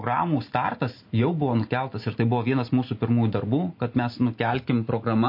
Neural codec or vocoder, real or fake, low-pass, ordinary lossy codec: none; real; 5.4 kHz; MP3, 32 kbps